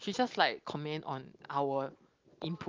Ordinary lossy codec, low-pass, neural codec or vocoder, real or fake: Opus, 32 kbps; 7.2 kHz; none; real